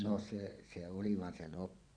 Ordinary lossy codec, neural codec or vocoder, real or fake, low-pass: none; vocoder, 24 kHz, 100 mel bands, Vocos; fake; 9.9 kHz